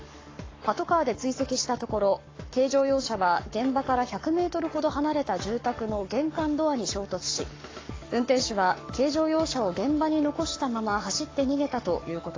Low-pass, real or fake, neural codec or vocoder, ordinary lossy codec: 7.2 kHz; fake; codec, 44.1 kHz, 7.8 kbps, Pupu-Codec; AAC, 32 kbps